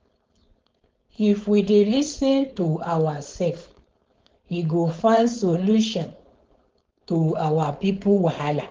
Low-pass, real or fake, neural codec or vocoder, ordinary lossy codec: 7.2 kHz; fake; codec, 16 kHz, 4.8 kbps, FACodec; Opus, 32 kbps